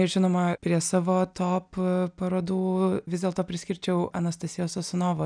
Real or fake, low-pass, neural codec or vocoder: real; 9.9 kHz; none